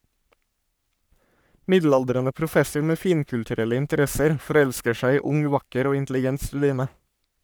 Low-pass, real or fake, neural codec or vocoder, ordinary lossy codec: none; fake; codec, 44.1 kHz, 3.4 kbps, Pupu-Codec; none